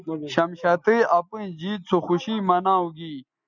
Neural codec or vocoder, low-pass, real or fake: none; 7.2 kHz; real